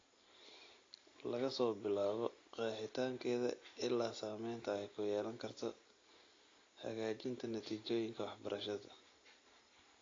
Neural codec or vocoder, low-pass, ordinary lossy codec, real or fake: none; 7.2 kHz; AAC, 32 kbps; real